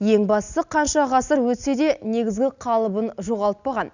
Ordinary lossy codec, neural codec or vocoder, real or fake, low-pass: none; none; real; 7.2 kHz